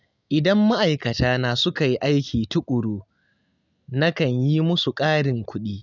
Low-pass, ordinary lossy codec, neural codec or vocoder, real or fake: 7.2 kHz; none; none; real